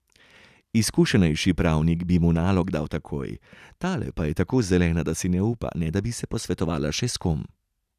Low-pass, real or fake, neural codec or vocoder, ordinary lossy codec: 14.4 kHz; real; none; none